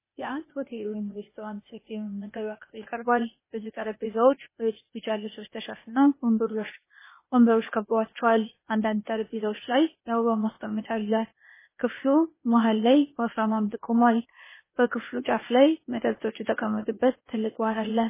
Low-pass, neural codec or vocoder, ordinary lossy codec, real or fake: 3.6 kHz; codec, 16 kHz, 0.8 kbps, ZipCodec; MP3, 16 kbps; fake